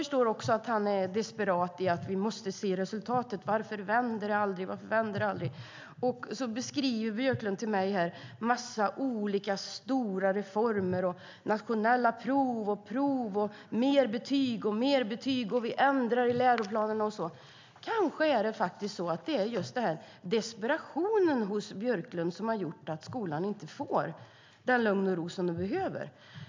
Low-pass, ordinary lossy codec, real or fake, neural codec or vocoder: 7.2 kHz; MP3, 64 kbps; real; none